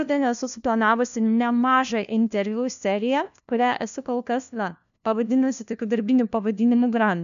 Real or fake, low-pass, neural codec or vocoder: fake; 7.2 kHz; codec, 16 kHz, 1 kbps, FunCodec, trained on LibriTTS, 50 frames a second